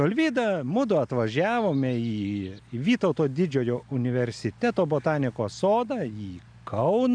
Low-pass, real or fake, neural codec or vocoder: 9.9 kHz; real; none